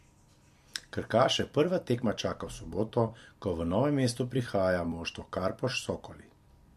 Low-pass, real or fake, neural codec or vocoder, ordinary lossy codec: 14.4 kHz; fake; vocoder, 44.1 kHz, 128 mel bands every 256 samples, BigVGAN v2; MP3, 64 kbps